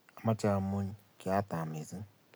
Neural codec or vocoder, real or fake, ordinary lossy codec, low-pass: none; real; none; none